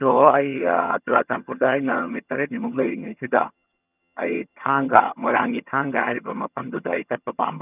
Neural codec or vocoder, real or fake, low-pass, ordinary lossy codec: vocoder, 22.05 kHz, 80 mel bands, HiFi-GAN; fake; 3.6 kHz; none